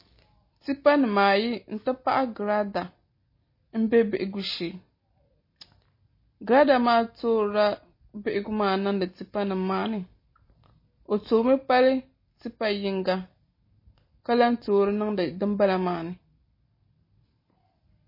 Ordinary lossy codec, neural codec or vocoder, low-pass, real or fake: MP3, 24 kbps; none; 5.4 kHz; real